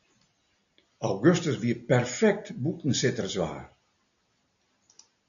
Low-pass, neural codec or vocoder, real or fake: 7.2 kHz; none; real